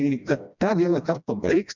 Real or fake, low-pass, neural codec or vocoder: fake; 7.2 kHz; codec, 16 kHz, 1 kbps, FreqCodec, smaller model